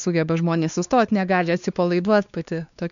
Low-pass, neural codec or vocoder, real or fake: 7.2 kHz; codec, 16 kHz, 2 kbps, X-Codec, WavLM features, trained on Multilingual LibriSpeech; fake